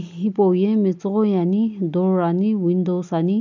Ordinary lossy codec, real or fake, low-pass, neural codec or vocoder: none; real; 7.2 kHz; none